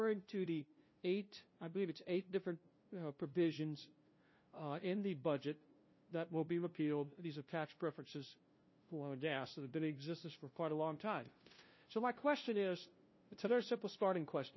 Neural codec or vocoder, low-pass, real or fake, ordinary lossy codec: codec, 16 kHz, 0.5 kbps, FunCodec, trained on LibriTTS, 25 frames a second; 7.2 kHz; fake; MP3, 24 kbps